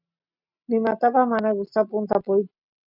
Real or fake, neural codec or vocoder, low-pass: real; none; 5.4 kHz